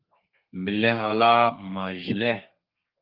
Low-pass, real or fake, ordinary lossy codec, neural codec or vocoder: 5.4 kHz; fake; Opus, 24 kbps; codec, 32 kHz, 1.9 kbps, SNAC